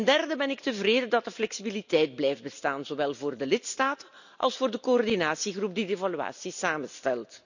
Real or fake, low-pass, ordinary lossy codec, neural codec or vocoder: real; 7.2 kHz; none; none